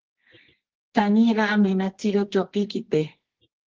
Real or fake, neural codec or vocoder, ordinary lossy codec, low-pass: fake; codec, 24 kHz, 0.9 kbps, WavTokenizer, medium music audio release; Opus, 16 kbps; 7.2 kHz